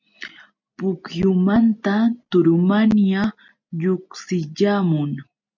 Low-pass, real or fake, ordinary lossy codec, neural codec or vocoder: 7.2 kHz; real; MP3, 64 kbps; none